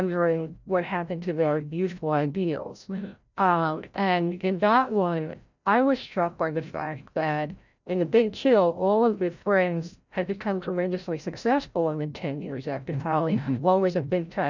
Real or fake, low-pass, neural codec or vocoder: fake; 7.2 kHz; codec, 16 kHz, 0.5 kbps, FreqCodec, larger model